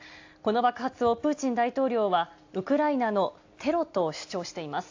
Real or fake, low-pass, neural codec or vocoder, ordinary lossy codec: real; 7.2 kHz; none; AAC, 48 kbps